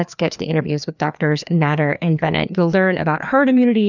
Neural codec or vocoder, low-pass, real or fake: codec, 16 kHz, 2 kbps, FreqCodec, larger model; 7.2 kHz; fake